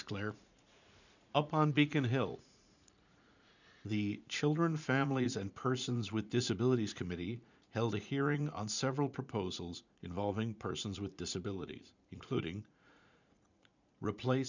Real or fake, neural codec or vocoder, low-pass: fake; vocoder, 44.1 kHz, 80 mel bands, Vocos; 7.2 kHz